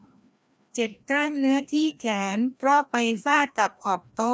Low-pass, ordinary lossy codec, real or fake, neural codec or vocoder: none; none; fake; codec, 16 kHz, 1 kbps, FreqCodec, larger model